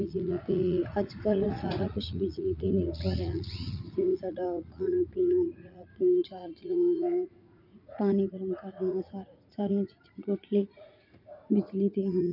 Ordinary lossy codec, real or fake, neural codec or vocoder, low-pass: none; fake; vocoder, 44.1 kHz, 80 mel bands, Vocos; 5.4 kHz